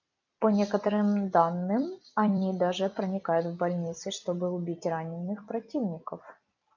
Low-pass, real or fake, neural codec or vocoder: 7.2 kHz; fake; vocoder, 44.1 kHz, 128 mel bands every 256 samples, BigVGAN v2